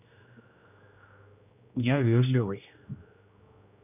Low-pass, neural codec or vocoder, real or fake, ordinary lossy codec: 3.6 kHz; codec, 16 kHz, 1 kbps, X-Codec, HuBERT features, trained on general audio; fake; none